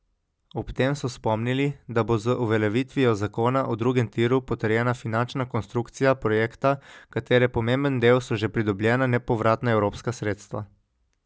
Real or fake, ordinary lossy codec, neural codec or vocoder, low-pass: real; none; none; none